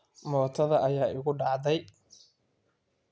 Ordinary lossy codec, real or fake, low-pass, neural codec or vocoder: none; real; none; none